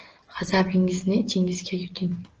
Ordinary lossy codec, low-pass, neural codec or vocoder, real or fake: Opus, 16 kbps; 7.2 kHz; none; real